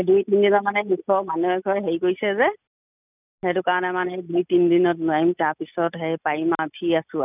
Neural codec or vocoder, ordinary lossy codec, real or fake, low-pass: none; none; real; 3.6 kHz